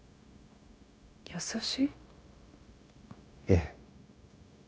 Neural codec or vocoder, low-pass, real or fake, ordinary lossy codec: codec, 16 kHz, 0.9 kbps, LongCat-Audio-Codec; none; fake; none